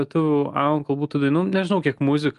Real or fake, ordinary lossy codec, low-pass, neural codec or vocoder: real; Opus, 32 kbps; 10.8 kHz; none